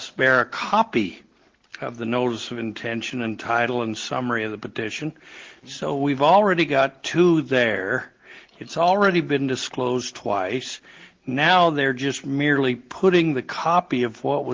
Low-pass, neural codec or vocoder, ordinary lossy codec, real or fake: 7.2 kHz; none; Opus, 16 kbps; real